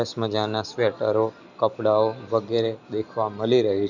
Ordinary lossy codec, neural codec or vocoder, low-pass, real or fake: none; none; 7.2 kHz; real